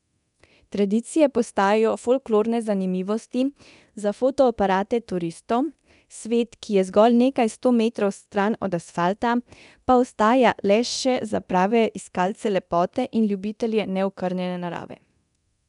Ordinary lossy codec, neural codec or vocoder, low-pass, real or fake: none; codec, 24 kHz, 0.9 kbps, DualCodec; 10.8 kHz; fake